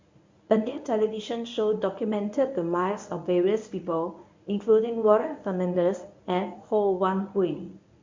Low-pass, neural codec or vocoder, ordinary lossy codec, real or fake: 7.2 kHz; codec, 24 kHz, 0.9 kbps, WavTokenizer, medium speech release version 1; MP3, 64 kbps; fake